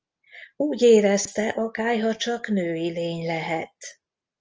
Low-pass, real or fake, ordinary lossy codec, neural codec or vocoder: 7.2 kHz; real; Opus, 24 kbps; none